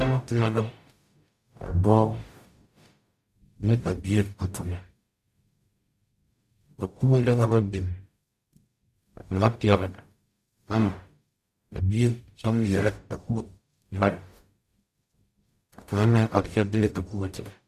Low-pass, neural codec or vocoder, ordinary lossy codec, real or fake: 14.4 kHz; codec, 44.1 kHz, 0.9 kbps, DAC; Opus, 64 kbps; fake